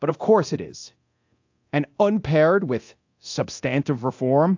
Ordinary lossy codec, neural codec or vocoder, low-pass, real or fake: AAC, 48 kbps; codec, 24 kHz, 0.9 kbps, DualCodec; 7.2 kHz; fake